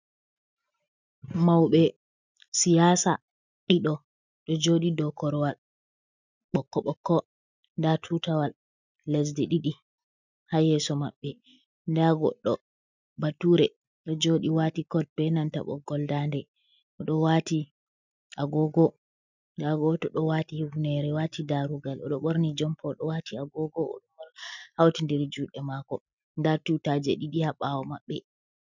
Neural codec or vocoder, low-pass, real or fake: none; 7.2 kHz; real